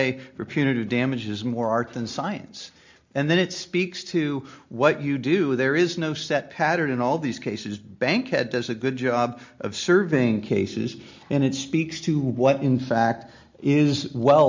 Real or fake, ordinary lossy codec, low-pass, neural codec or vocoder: real; MP3, 64 kbps; 7.2 kHz; none